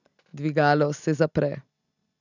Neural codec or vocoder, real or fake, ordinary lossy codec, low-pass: none; real; none; 7.2 kHz